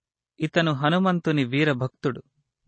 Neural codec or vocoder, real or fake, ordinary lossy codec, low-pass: none; real; MP3, 32 kbps; 9.9 kHz